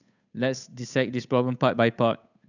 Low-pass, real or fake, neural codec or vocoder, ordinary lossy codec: 7.2 kHz; fake; codec, 16 kHz, 8 kbps, FunCodec, trained on Chinese and English, 25 frames a second; none